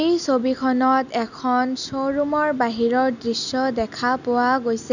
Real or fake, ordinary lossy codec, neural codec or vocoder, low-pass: real; none; none; 7.2 kHz